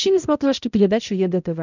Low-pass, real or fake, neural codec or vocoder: 7.2 kHz; fake; codec, 16 kHz, 0.5 kbps, X-Codec, HuBERT features, trained on balanced general audio